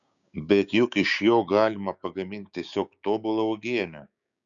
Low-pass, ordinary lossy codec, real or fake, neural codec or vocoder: 7.2 kHz; MP3, 96 kbps; fake; codec, 16 kHz, 6 kbps, DAC